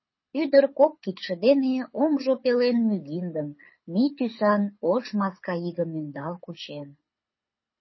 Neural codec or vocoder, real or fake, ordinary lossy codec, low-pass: codec, 24 kHz, 6 kbps, HILCodec; fake; MP3, 24 kbps; 7.2 kHz